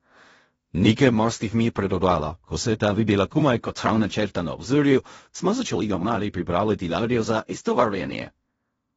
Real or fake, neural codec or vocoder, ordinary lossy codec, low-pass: fake; codec, 16 kHz in and 24 kHz out, 0.4 kbps, LongCat-Audio-Codec, fine tuned four codebook decoder; AAC, 24 kbps; 10.8 kHz